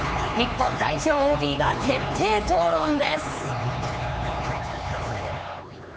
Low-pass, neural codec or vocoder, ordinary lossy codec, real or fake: none; codec, 16 kHz, 4 kbps, X-Codec, HuBERT features, trained on LibriSpeech; none; fake